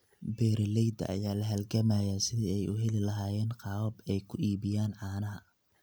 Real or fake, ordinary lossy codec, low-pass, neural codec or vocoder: real; none; none; none